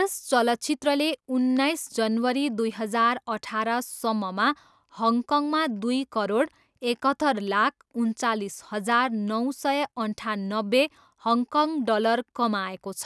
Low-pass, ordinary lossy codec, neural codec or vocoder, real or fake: none; none; none; real